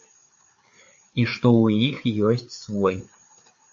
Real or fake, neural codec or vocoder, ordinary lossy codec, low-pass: fake; codec, 16 kHz, 4 kbps, FreqCodec, larger model; AAC, 64 kbps; 7.2 kHz